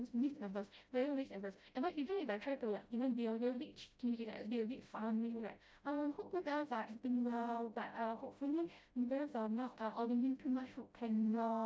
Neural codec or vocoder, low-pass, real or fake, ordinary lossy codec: codec, 16 kHz, 0.5 kbps, FreqCodec, smaller model; none; fake; none